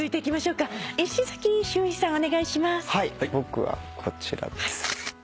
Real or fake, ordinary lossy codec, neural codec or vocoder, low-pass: real; none; none; none